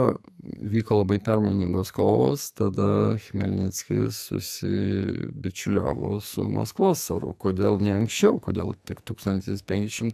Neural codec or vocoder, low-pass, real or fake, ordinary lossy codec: codec, 44.1 kHz, 2.6 kbps, SNAC; 14.4 kHz; fake; AAC, 96 kbps